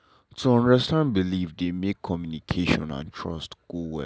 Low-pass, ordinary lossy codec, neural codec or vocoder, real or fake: none; none; none; real